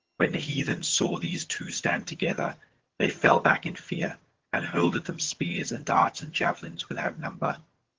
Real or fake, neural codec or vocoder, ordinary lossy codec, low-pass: fake; vocoder, 22.05 kHz, 80 mel bands, HiFi-GAN; Opus, 16 kbps; 7.2 kHz